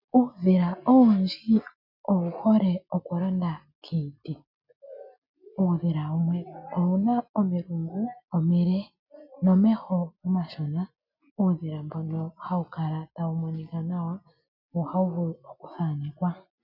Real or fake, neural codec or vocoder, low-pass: real; none; 5.4 kHz